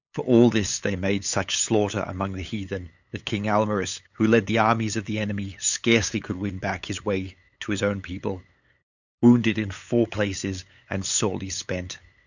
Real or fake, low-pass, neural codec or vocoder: fake; 7.2 kHz; codec, 16 kHz, 8 kbps, FunCodec, trained on LibriTTS, 25 frames a second